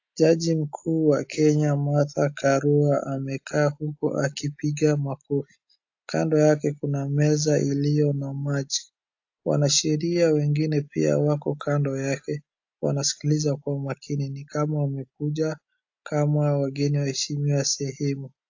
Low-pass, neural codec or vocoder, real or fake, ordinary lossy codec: 7.2 kHz; none; real; AAC, 48 kbps